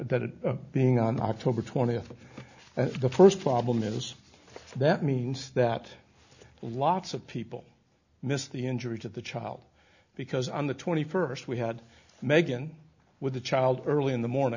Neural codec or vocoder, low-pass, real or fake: none; 7.2 kHz; real